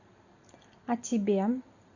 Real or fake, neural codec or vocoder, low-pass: real; none; 7.2 kHz